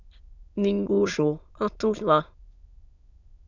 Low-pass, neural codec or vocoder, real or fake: 7.2 kHz; autoencoder, 22.05 kHz, a latent of 192 numbers a frame, VITS, trained on many speakers; fake